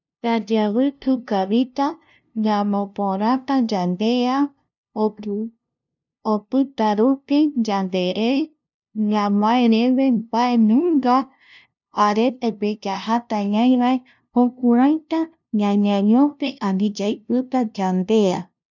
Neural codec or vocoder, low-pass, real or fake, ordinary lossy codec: codec, 16 kHz, 0.5 kbps, FunCodec, trained on LibriTTS, 25 frames a second; 7.2 kHz; fake; none